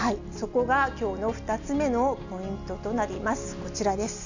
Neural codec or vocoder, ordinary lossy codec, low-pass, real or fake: none; none; 7.2 kHz; real